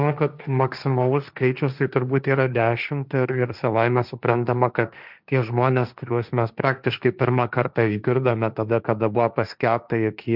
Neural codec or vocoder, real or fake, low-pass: codec, 16 kHz, 1.1 kbps, Voila-Tokenizer; fake; 5.4 kHz